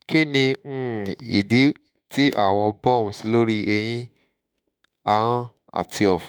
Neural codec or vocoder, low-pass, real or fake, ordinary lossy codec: autoencoder, 48 kHz, 32 numbers a frame, DAC-VAE, trained on Japanese speech; none; fake; none